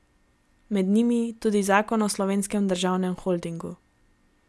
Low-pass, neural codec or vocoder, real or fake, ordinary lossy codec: none; none; real; none